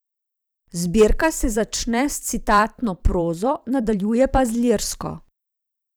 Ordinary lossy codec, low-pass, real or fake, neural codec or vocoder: none; none; fake; vocoder, 44.1 kHz, 128 mel bands every 512 samples, BigVGAN v2